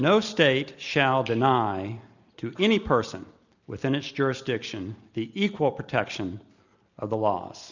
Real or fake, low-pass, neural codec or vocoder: real; 7.2 kHz; none